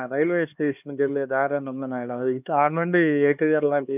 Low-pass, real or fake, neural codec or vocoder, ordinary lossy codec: 3.6 kHz; fake; codec, 16 kHz, 2 kbps, X-Codec, HuBERT features, trained on LibriSpeech; none